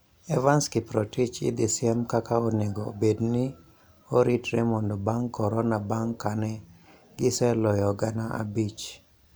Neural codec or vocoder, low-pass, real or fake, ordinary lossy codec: none; none; real; none